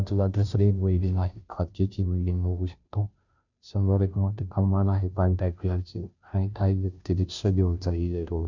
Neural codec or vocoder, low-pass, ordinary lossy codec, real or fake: codec, 16 kHz, 0.5 kbps, FunCodec, trained on Chinese and English, 25 frames a second; 7.2 kHz; none; fake